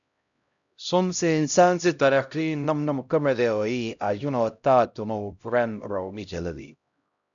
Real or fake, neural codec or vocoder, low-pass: fake; codec, 16 kHz, 0.5 kbps, X-Codec, HuBERT features, trained on LibriSpeech; 7.2 kHz